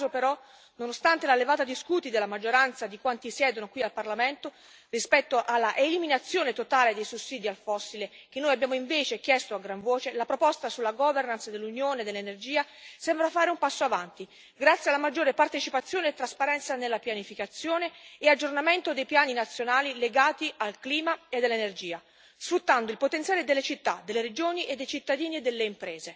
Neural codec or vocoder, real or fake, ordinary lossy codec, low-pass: none; real; none; none